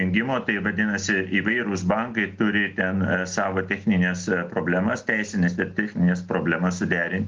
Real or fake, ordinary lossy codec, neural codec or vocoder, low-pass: real; Opus, 32 kbps; none; 7.2 kHz